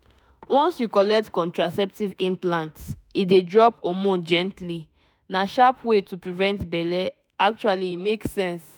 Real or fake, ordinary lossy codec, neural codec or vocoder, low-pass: fake; none; autoencoder, 48 kHz, 32 numbers a frame, DAC-VAE, trained on Japanese speech; none